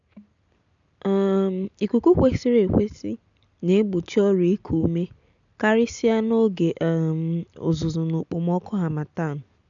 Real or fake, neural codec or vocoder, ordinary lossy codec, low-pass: real; none; none; 7.2 kHz